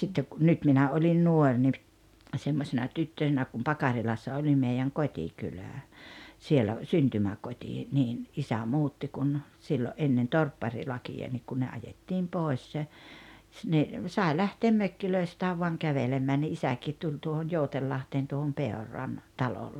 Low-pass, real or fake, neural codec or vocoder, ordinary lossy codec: 19.8 kHz; real; none; none